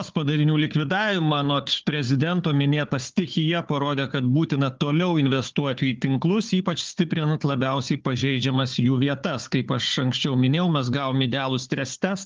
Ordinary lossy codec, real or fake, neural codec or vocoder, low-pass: Opus, 32 kbps; fake; codec, 16 kHz, 4 kbps, FunCodec, trained on Chinese and English, 50 frames a second; 7.2 kHz